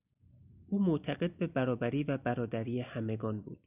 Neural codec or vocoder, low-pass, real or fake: none; 3.6 kHz; real